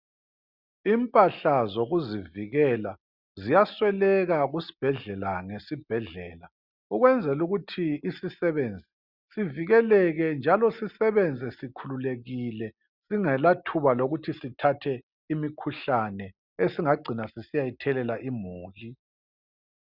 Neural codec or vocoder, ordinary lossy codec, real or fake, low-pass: none; Opus, 64 kbps; real; 5.4 kHz